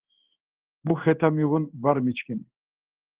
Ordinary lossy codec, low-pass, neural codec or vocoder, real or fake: Opus, 16 kbps; 3.6 kHz; codec, 16 kHz in and 24 kHz out, 1 kbps, XY-Tokenizer; fake